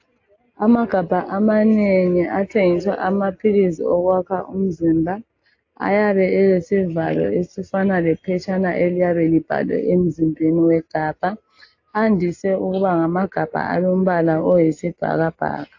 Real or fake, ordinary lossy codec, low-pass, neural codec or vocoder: real; AAC, 48 kbps; 7.2 kHz; none